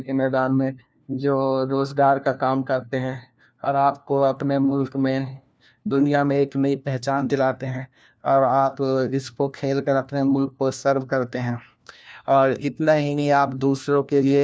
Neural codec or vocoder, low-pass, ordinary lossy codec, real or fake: codec, 16 kHz, 1 kbps, FunCodec, trained on LibriTTS, 50 frames a second; none; none; fake